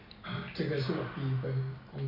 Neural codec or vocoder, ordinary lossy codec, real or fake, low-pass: none; none; real; 5.4 kHz